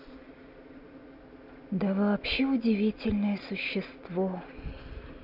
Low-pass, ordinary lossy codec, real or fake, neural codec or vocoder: 5.4 kHz; none; real; none